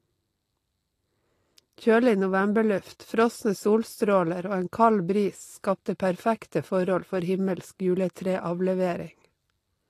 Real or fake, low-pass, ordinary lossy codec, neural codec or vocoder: real; 14.4 kHz; AAC, 48 kbps; none